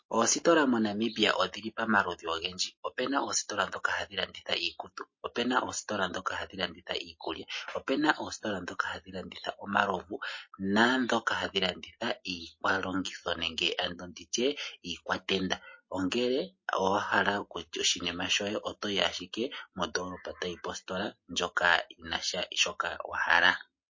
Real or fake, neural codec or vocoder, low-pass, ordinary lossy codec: real; none; 7.2 kHz; MP3, 32 kbps